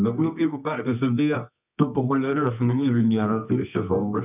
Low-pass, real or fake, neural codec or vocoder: 3.6 kHz; fake; codec, 24 kHz, 0.9 kbps, WavTokenizer, medium music audio release